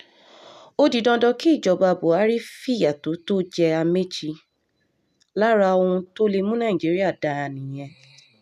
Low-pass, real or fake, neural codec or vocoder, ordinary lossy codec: 14.4 kHz; real; none; none